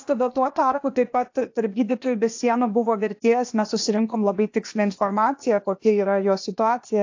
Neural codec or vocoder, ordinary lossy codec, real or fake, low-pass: codec, 16 kHz, 0.8 kbps, ZipCodec; AAC, 48 kbps; fake; 7.2 kHz